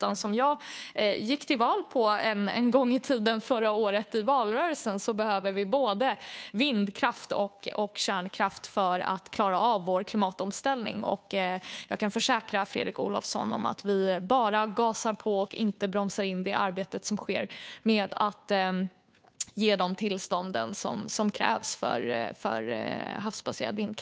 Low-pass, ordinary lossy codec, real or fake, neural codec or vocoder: none; none; fake; codec, 16 kHz, 2 kbps, FunCodec, trained on Chinese and English, 25 frames a second